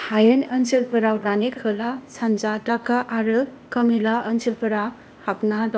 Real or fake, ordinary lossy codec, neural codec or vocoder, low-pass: fake; none; codec, 16 kHz, 0.8 kbps, ZipCodec; none